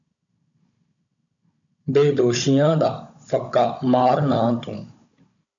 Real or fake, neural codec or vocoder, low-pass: fake; codec, 16 kHz, 16 kbps, FunCodec, trained on Chinese and English, 50 frames a second; 7.2 kHz